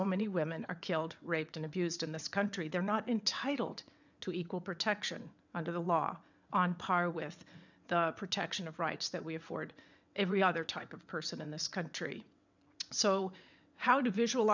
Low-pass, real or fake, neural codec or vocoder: 7.2 kHz; fake; vocoder, 22.05 kHz, 80 mel bands, WaveNeXt